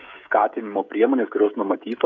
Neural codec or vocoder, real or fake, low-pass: codec, 16 kHz, 16 kbps, FreqCodec, smaller model; fake; 7.2 kHz